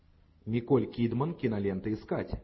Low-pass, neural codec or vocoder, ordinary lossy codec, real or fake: 7.2 kHz; none; MP3, 24 kbps; real